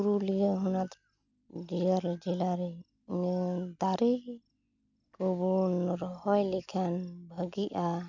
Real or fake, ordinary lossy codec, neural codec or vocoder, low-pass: real; none; none; 7.2 kHz